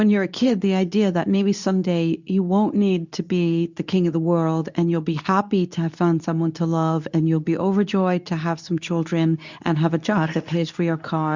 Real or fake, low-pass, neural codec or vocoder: fake; 7.2 kHz; codec, 24 kHz, 0.9 kbps, WavTokenizer, medium speech release version 2